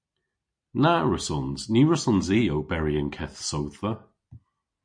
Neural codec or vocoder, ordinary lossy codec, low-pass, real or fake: none; MP3, 48 kbps; 9.9 kHz; real